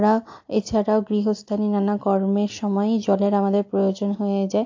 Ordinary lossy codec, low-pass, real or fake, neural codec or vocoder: AAC, 48 kbps; 7.2 kHz; real; none